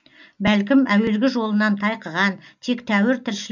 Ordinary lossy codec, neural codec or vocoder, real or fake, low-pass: none; none; real; 7.2 kHz